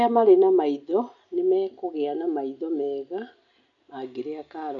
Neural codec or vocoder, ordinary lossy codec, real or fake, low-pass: none; none; real; 7.2 kHz